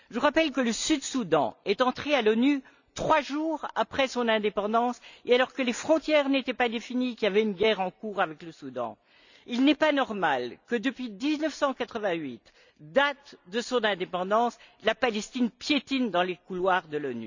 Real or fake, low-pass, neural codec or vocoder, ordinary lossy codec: real; 7.2 kHz; none; none